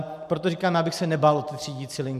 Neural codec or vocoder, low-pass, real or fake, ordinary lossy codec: none; 14.4 kHz; real; MP3, 96 kbps